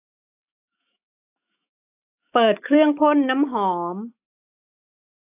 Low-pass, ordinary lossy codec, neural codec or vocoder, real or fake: 3.6 kHz; AAC, 24 kbps; autoencoder, 48 kHz, 128 numbers a frame, DAC-VAE, trained on Japanese speech; fake